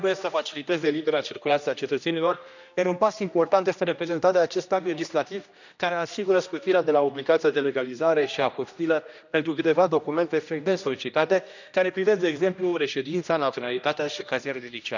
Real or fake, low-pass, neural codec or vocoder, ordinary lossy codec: fake; 7.2 kHz; codec, 16 kHz, 1 kbps, X-Codec, HuBERT features, trained on general audio; none